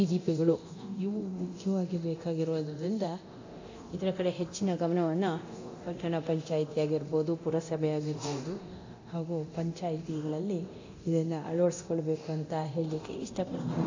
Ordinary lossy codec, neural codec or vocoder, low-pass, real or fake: AAC, 48 kbps; codec, 24 kHz, 0.9 kbps, DualCodec; 7.2 kHz; fake